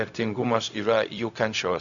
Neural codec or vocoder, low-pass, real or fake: codec, 16 kHz, 0.4 kbps, LongCat-Audio-Codec; 7.2 kHz; fake